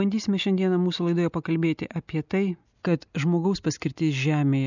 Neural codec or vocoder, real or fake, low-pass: none; real; 7.2 kHz